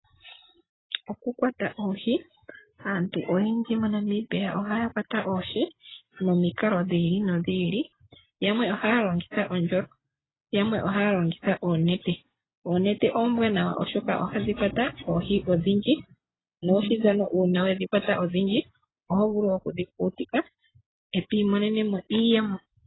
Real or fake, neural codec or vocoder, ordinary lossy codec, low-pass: real; none; AAC, 16 kbps; 7.2 kHz